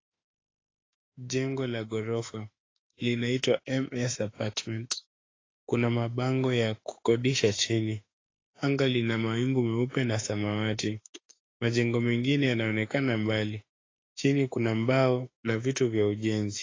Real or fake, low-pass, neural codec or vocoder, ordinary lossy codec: fake; 7.2 kHz; autoencoder, 48 kHz, 32 numbers a frame, DAC-VAE, trained on Japanese speech; AAC, 32 kbps